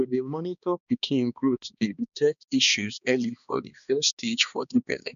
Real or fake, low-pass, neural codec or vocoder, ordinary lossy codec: fake; 7.2 kHz; codec, 16 kHz, 2 kbps, X-Codec, HuBERT features, trained on balanced general audio; none